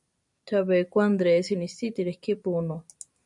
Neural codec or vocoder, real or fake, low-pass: vocoder, 44.1 kHz, 128 mel bands every 256 samples, BigVGAN v2; fake; 10.8 kHz